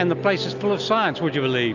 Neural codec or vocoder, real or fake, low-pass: none; real; 7.2 kHz